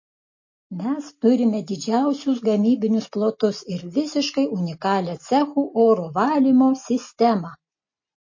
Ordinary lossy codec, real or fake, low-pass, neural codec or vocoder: MP3, 32 kbps; real; 7.2 kHz; none